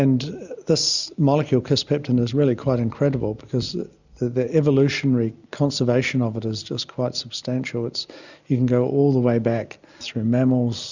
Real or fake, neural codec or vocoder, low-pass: real; none; 7.2 kHz